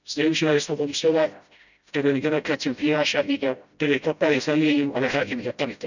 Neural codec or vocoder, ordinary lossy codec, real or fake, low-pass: codec, 16 kHz, 0.5 kbps, FreqCodec, smaller model; none; fake; 7.2 kHz